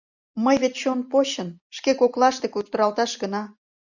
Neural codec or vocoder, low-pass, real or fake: none; 7.2 kHz; real